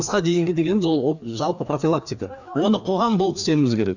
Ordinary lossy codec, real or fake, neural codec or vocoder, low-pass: none; fake; codec, 16 kHz, 2 kbps, FreqCodec, larger model; 7.2 kHz